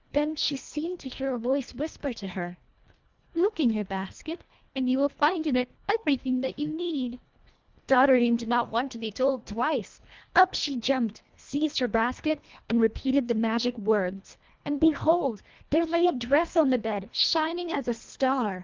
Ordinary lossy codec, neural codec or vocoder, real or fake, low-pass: Opus, 32 kbps; codec, 24 kHz, 1.5 kbps, HILCodec; fake; 7.2 kHz